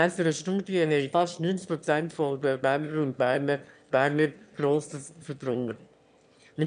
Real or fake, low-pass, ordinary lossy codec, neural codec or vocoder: fake; 9.9 kHz; none; autoencoder, 22.05 kHz, a latent of 192 numbers a frame, VITS, trained on one speaker